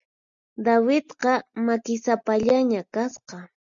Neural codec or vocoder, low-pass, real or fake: none; 7.2 kHz; real